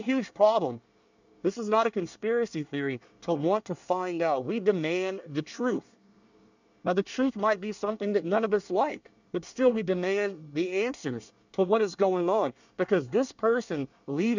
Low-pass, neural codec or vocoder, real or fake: 7.2 kHz; codec, 24 kHz, 1 kbps, SNAC; fake